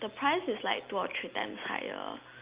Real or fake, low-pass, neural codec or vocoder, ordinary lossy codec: real; 3.6 kHz; none; Opus, 32 kbps